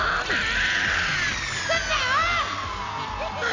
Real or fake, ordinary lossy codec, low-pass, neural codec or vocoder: real; AAC, 32 kbps; 7.2 kHz; none